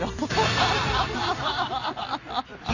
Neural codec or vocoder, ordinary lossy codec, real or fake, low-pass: none; none; real; 7.2 kHz